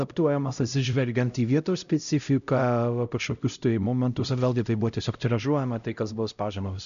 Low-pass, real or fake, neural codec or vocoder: 7.2 kHz; fake; codec, 16 kHz, 0.5 kbps, X-Codec, HuBERT features, trained on LibriSpeech